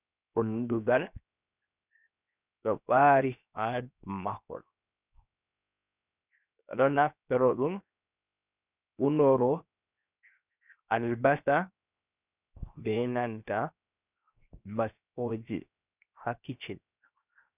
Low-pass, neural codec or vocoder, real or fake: 3.6 kHz; codec, 16 kHz, 0.7 kbps, FocalCodec; fake